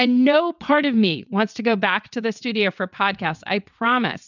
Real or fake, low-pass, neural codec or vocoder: fake; 7.2 kHz; vocoder, 22.05 kHz, 80 mel bands, WaveNeXt